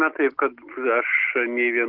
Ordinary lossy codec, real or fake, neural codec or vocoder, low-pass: Opus, 16 kbps; real; none; 5.4 kHz